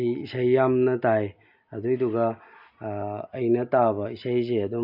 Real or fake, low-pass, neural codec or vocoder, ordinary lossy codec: real; 5.4 kHz; none; AAC, 48 kbps